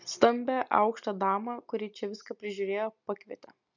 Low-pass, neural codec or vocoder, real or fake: 7.2 kHz; none; real